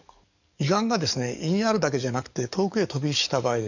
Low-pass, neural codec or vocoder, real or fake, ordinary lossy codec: 7.2 kHz; codec, 44.1 kHz, 7.8 kbps, DAC; fake; none